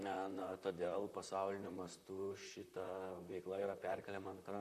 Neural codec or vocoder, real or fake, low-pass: vocoder, 44.1 kHz, 128 mel bands, Pupu-Vocoder; fake; 14.4 kHz